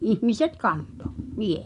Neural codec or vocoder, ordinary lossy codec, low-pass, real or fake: vocoder, 24 kHz, 100 mel bands, Vocos; none; 10.8 kHz; fake